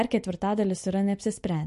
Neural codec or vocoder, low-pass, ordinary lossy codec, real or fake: none; 14.4 kHz; MP3, 48 kbps; real